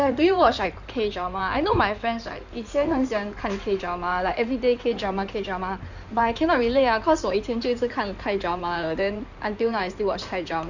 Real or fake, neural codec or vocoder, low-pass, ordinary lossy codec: fake; codec, 16 kHz in and 24 kHz out, 2.2 kbps, FireRedTTS-2 codec; 7.2 kHz; none